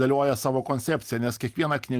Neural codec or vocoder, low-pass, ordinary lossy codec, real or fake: none; 14.4 kHz; Opus, 32 kbps; real